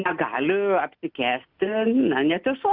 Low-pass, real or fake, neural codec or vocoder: 5.4 kHz; real; none